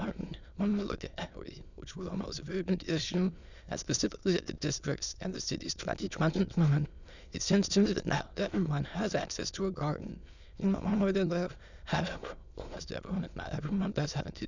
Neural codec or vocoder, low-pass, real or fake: autoencoder, 22.05 kHz, a latent of 192 numbers a frame, VITS, trained on many speakers; 7.2 kHz; fake